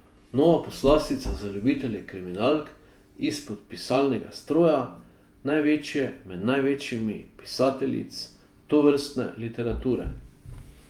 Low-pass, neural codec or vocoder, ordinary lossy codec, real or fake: 19.8 kHz; vocoder, 48 kHz, 128 mel bands, Vocos; Opus, 32 kbps; fake